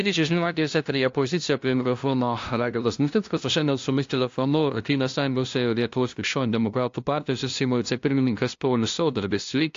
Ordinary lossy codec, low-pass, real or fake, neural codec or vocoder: AAC, 48 kbps; 7.2 kHz; fake; codec, 16 kHz, 0.5 kbps, FunCodec, trained on LibriTTS, 25 frames a second